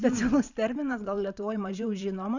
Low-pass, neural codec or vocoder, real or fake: 7.2 kHz; vocoder, 44.1 kHz, 128 mel bands every 512 samples, BigVGAN v2; fake